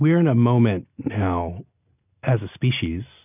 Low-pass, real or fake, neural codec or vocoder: 3.6 kHz; real; none